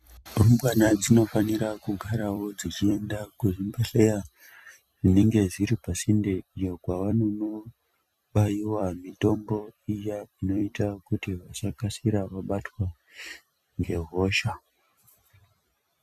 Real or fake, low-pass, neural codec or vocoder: fake; 14.4 kHz; vocoder, 44.1 kHz, 128 mel bands every 256 samples, BigVGAN v2